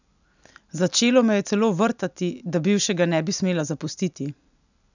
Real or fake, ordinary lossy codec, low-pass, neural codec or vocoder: real; none; 7.2 kHz; none